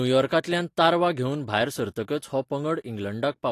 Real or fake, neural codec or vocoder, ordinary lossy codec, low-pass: real; none; AAC, 48 kbps; 14.4 kHz